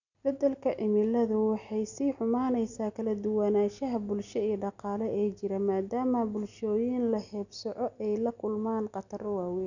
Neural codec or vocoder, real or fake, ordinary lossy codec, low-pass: none; real; none; 7.2 kHz